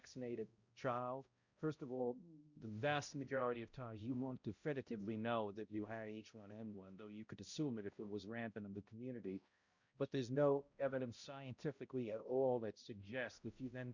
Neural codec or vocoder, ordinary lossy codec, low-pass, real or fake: codec, 16 kHz, 0.5 kbps, X-Codec, HuBERT features, trained on balanced general audio; Opus, 64 kbps; 7.2 kHz; fake